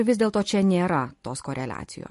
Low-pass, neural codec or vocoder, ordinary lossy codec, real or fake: 14.4 kHz; none; MP3, 48 kbps; real